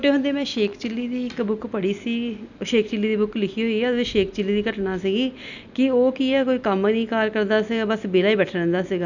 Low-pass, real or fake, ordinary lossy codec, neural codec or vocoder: 7.2 kHz; real; none; none